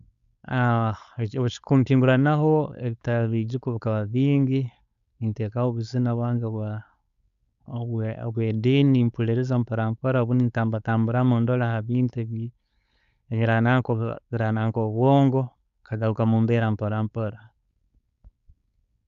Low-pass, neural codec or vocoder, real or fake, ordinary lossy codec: 7.2 kHz; codec, 16 kHz, 4.8 kbps, FACodec; fake; Opus, 64 kbps